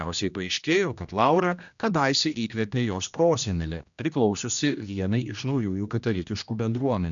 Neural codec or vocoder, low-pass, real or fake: codec, 16 kHz, 1 kbps, X-Codec, HuBERT features, trained on general audio; 7.2 kHz; fake